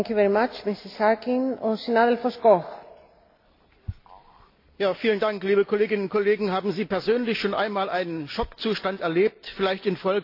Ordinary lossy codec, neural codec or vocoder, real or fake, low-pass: AAC, 32 kbps; none; real; 5.4 kHz